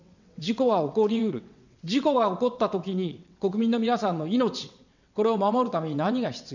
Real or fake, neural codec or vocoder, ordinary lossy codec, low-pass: fake; vocoder, 22.05 kHz, 80 mel bands, WaveNeXt; none; 7.2 kHz